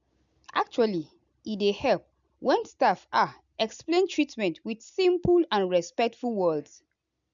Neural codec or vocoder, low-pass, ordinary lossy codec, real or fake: none; 7.2 kHz; MP3, 96 kbps; real